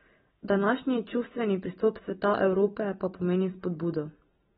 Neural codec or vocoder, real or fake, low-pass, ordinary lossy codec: none; real; 7.2 kHz; AAC, 16 kbps